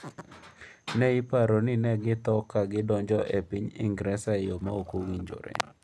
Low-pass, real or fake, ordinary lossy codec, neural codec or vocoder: none; real; none; none